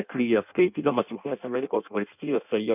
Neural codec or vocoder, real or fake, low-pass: codec, 16 kHz in and 24 kHz out, 0.6 kbps, FireRedTTS-2 codec; fake; 3.6 kHz